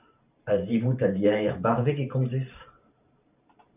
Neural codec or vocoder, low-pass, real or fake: vocoder, 44.1 kHz, 128 mel bands every 512 samples, BigVGAN v2; 3.6 kHz; fake